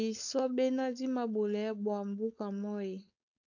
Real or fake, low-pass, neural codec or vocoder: fake; 7.2 kHz; codec, 16 kHz, 4.8 kbps, FACodec